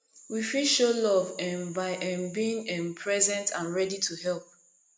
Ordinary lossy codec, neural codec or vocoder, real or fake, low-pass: none; none; real; none